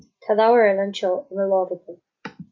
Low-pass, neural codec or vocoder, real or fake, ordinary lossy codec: 7.2 kHz; none; real; AAC, 48 kbps